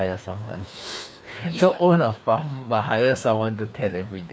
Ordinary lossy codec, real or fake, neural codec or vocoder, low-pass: none; fake; codec, 16 kHz, 2 kbps, FreqCodec, larger model; none